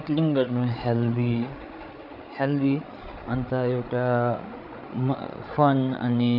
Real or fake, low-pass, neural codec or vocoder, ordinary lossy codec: fake; 5.4 kHz; codec, 16 kHz, 8 kbps, FreqCodec, larger model; none